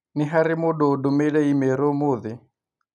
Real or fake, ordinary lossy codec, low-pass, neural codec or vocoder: real; none; none; none